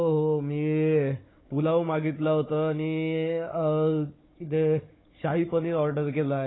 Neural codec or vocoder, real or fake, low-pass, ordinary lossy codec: codec, 16 kHz, 16 kbps, FunCodec, trained on Chinese and English, 50 frames a second; fake; 7.2 kHz; AAC, 16 kbps